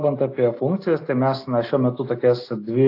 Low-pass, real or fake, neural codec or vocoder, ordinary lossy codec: 5.4 kHz; real; none; AAC, 32 kbps